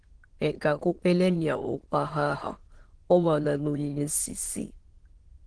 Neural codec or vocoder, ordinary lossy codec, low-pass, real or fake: autoencoder, 22.05 kHz, a latent of 192 numbers a frame, VITS, trained on many speakers; Opus, 16 kbps; 9.9 kHz; fake